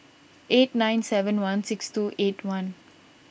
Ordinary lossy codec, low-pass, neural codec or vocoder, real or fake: none; none; none; real